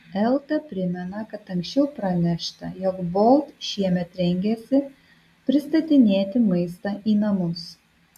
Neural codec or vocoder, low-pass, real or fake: none; 14.4 kHz; real